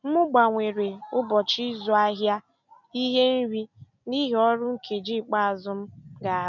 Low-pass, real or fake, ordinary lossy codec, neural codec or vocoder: 7.2 kHz; real; none; none